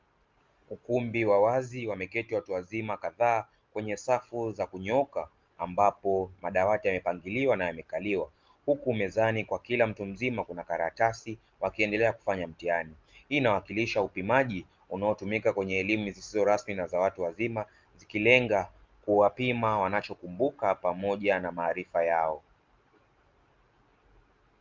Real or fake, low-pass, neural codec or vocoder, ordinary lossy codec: real; 7.2 kHz; none; Opus, 32 kbps